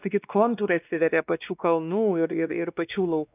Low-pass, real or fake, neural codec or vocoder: 3.6 kHz; fake; codec, 16 kHz, 2 kbps, X-Codec, WavLM features, trained on Multilingual LibriSpeech